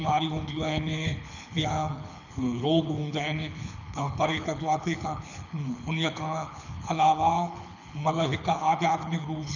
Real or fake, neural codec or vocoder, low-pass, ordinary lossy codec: fake; codec, 24 kHz, 6 kbps, HILCodec; 7.2 kHz; none